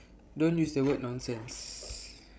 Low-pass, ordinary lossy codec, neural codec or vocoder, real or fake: none; none; codec, 16 kHz, 16 kbps, FreqCodec, larger model; fake